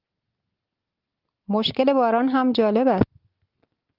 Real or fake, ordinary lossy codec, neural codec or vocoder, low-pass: real; Opus, 24 kbps; none; 5.4 kHz